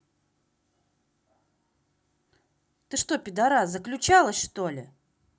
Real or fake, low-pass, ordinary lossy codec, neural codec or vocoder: real; none; none; none